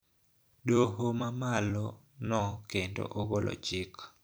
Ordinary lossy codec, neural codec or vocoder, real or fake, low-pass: none; vocoder, 44.1 kHz, 128 mel bands every 256 samples, BigVGAN v2; fake; none